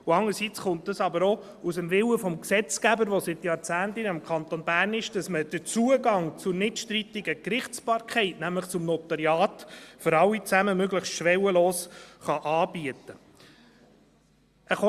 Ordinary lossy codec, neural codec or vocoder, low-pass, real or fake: Opus, 64 kbps; none; 14.4 kHz; real